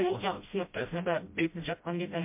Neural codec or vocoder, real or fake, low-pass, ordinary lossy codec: codec, 16 kHz, 0.5 kbps, FreqCodec, smaller model; fake; 3.6 kHz; MP3, 32 kbps